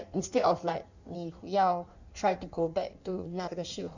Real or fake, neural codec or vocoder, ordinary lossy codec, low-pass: fake; codec, 16 kHz in and 24 kHz out, 1.1 kbps, FireRedTTS-2 codec; none; 7.2 kHz